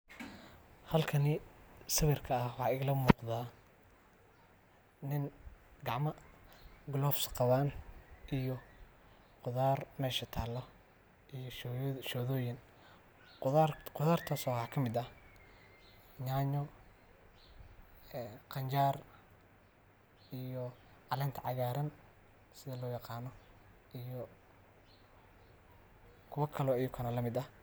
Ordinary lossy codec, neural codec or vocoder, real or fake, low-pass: none; none; real; none